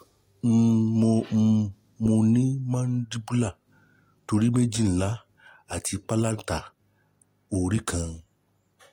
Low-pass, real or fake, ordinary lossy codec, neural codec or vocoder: 19.8 kHz; real; AAC, 48 kbps; none